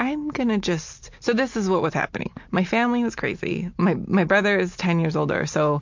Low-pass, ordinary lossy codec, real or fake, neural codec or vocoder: 7.2 kHz; MP3, 64 kbps; real; none